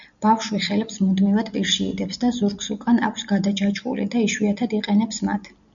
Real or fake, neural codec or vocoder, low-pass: real; none; 7.2 kHz